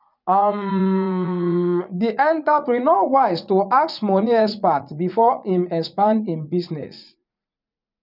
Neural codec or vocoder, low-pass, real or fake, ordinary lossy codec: vocoder, 44.1 kHz, 80 mel bands, Vocos; 5.4 kHz; fake; none